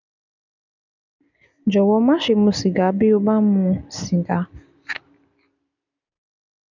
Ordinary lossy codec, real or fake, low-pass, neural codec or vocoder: none; real; 7.2 kHz; none